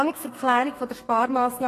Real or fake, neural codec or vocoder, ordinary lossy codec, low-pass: fake; codec, 32 kHz, 1.9 kbps, SNAC; AAC, 48 kbps; 14.4 kHz